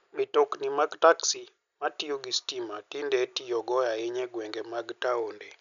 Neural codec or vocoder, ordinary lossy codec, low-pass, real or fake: none; none; 7.2 kHz; real